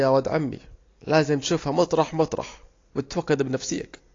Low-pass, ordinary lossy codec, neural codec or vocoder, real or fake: 7.2 kHz; AAC, 32 kbps; none; real